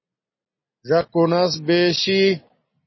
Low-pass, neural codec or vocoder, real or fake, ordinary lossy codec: 7.2 kHz; none; real; MP3, 24 kbps